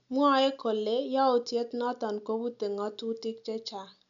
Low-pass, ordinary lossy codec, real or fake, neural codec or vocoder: 7.2 kHz; none; real; none